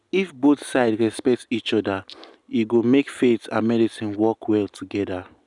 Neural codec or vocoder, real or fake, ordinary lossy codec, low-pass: none; real; none; 10.8 kHz